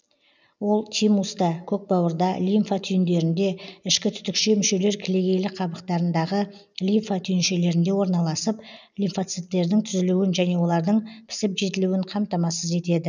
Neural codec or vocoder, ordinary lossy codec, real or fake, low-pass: none; none; real; 7.2 kHz